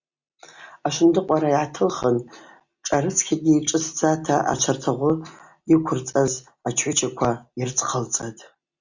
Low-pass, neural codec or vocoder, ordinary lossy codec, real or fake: 7.2 kHz; none; Opus, 64 kbps; real